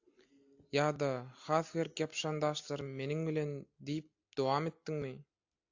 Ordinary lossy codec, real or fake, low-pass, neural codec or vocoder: AAC, 48 kbps; real; 7.2 kHz; none